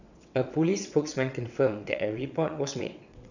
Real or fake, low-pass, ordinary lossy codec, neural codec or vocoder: fake; 7.2 kHz; none; vocoder, 22.05 kHz, 80 mel bands, WaveNeXt